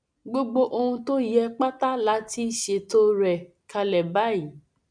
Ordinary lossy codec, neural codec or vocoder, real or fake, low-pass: none; none; real; 9.9 kHz